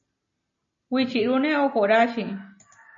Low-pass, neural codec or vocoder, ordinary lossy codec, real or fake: 7.2 kHz; none; MP3, 32 kbps; real